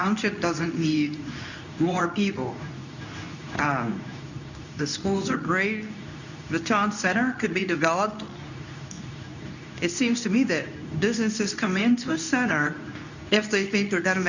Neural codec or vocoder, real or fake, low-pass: codec, 24 kHz, 0.9 kbps, WavTokenizer, medium speech release version 2; fake; 7.2 kHz